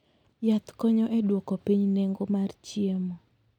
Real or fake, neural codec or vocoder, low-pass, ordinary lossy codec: real; none; 19.8 kHz; none